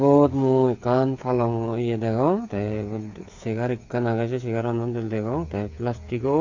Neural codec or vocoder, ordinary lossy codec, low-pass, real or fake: codec, 16 kHz, 8 kbps, FreqCodec, smaller model; AAC, 48 kbps; 7.2 kHz; fake